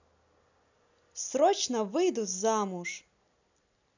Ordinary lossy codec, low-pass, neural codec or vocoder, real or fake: none; 7.2 kHz; none; real